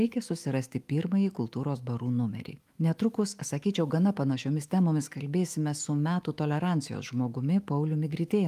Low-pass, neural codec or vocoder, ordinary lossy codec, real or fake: 14.4 kHz; autoencoder, 48 kHz, 128 numbers a frame, DAC-VAE, trained on Japanese speech; Opus, 32 kbps; fake